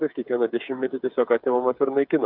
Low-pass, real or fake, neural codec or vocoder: 5.4 kHz; fake; codec, 16 kHz, 8 kbps, FreqCodec, smaller model